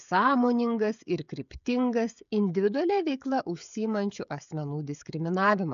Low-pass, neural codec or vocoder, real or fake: 7.2 kHz; codec, 16 kHz, 16 kbps, FreqCodec, smaller model; fake